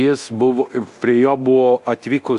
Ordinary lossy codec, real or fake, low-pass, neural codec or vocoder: Opus, 64 kbps; fake; 10.8 kHz; codec, 24 kHz, 0.9 kbps, DualCodec